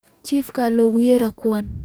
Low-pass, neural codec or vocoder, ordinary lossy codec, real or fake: none; codec, 44.1 kHz, 2.6 kbps, DAC; none; fake